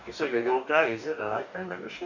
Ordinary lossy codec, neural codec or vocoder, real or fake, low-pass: none; codec, 44.1 kHz, 2.6 kbps, DAC; fake; 7.2 kHz